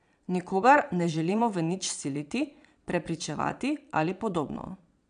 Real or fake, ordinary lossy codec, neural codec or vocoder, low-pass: fake; none; vocoder, 22.05 kHz, 80 mel bands, Vocos; 9.9 kHz